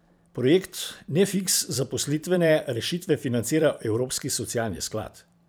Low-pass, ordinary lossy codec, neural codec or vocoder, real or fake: none; none; vocoder, 44.1 kHz, 128 mel bands every 512 samples, BigVGAN v2; fake